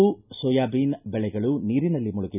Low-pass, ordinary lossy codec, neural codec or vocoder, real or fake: 3.6 kHz; none; none; real